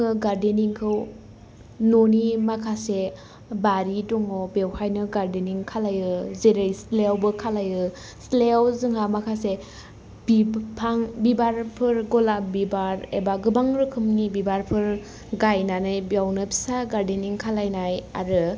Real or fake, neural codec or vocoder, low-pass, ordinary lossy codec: real; none; none; none